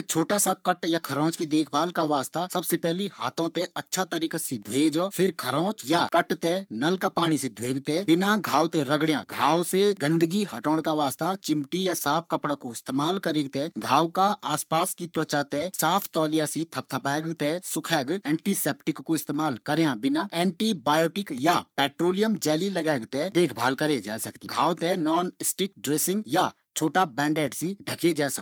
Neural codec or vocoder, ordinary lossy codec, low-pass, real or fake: codec, 44.1 kHz, 3.4 kbps, Pupu-Codec; none; none; fake